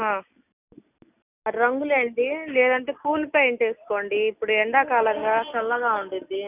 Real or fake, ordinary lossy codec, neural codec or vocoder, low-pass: real; none; none; 3.6 kHz